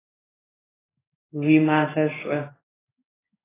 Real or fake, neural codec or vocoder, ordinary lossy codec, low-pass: fake; codec, 16 kHz in and 24 kHz out, 1 kbps, XY-Tokenizer; AAC, 16 kbps; 3.6 kHz